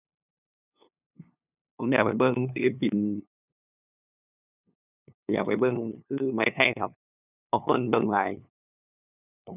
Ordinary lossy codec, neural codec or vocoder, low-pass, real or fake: none; codec, 16 kHz, 2 kbps, FunCodec, trained on LibriTTS, 25 frames a second; 3.6 kHz; fake